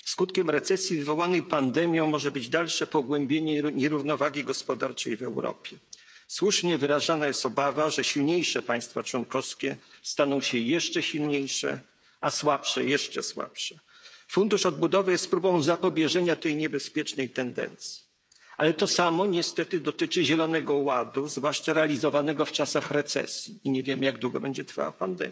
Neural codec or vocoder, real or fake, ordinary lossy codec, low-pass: codec, 16 kHz, 8 kbps, FreqCodec, smaller model; fake; none; none